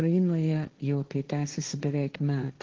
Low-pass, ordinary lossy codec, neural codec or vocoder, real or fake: 7.2 kHz; Opus, 16 kbps; codec, 16 kHz, 1.1 kbps, Voila-Tokenizer; fake